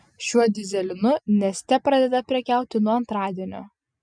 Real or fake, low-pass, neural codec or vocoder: real; 9.9 kHz; none